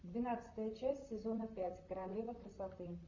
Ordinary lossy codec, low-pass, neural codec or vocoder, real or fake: MP3, 48 kbps; 7.2 kHz; vocoder, 44.1 kHz, 128 mel bands, Pupu-Vocoder; fake